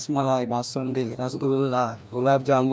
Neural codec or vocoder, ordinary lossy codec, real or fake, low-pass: codec, 16 kHz, 1 kbps, FreqCodec, larger model; none; fake; none